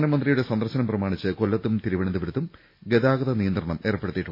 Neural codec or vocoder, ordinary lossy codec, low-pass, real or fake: none; MP3, 24 kbps; 5.4 kHz; real